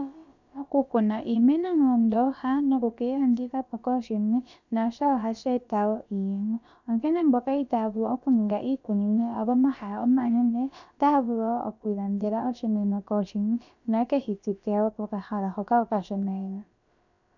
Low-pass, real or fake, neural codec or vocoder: 7.2 kHz; fake; codec, 16 kHz, about 1 kbps, DyCAST, with the encoder's durations